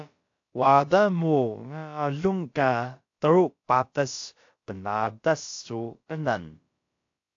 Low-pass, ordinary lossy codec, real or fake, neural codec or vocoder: 7.2 kHz; AAC, 48 kbps; fake; codec, 16 kHz, about 1 kbps, DyCAST, with the encoder's durations